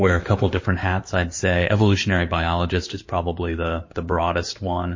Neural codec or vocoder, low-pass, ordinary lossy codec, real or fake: codec, 16 kHz in and 24 kHz out, 2.2 kbps, FireRedTTS-2 codec; 7.2 kHz; MP3, 32 kbps; fake